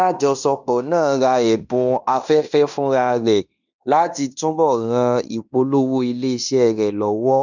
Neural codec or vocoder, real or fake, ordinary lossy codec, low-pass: codec, 16 kHz in and 24 kHz out, 0.9 kbps, LongCat-Audio-Codec, fine tuned four codebook decoder; fake; none; 7.2 kHz